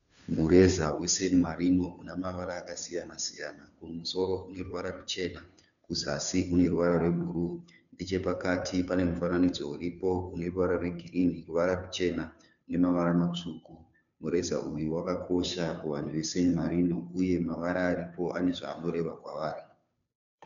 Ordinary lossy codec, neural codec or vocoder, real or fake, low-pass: MP3, 96 kbps; codec, 16 kHz, 2 kbps, FunCodec, trained on Chinese and English, 25 frames a second; fake; 7.2 kHz